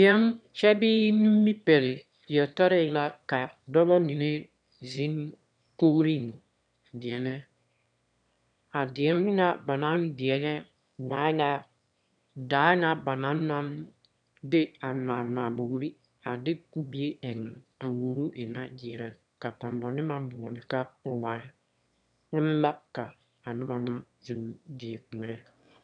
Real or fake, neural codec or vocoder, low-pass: fake; autoencoder, 22.05 kHz, a latent of 192 numbers a frame, VITS, trained on one speaker; 9.9 kHz